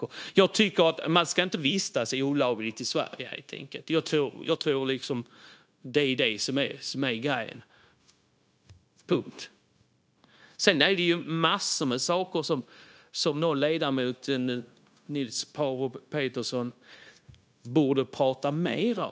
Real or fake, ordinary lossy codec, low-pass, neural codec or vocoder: fake; none; none; codec, 16 kHz, 0.9 kbps, LongCat-Audio-Codec